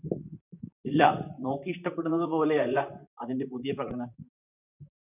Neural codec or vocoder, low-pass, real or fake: vocoder, 44.1 kHz, 128 mel bands, Pupu-Vocoder; 3.6 kHz; fake